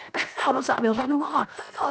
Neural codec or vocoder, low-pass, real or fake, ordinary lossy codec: codec, 16 kHz, 0.7 kbps, FocalCodec; none; fake; none